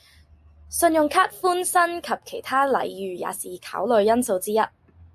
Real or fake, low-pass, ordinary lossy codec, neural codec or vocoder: real; 14.4 kHz; AAC, 96 kbps; none